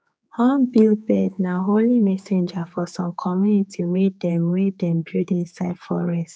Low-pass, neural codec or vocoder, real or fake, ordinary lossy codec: none; codec, 16 kHz, 4 kbps, X-Codec, HuBERT features, trained on general audio; fake; none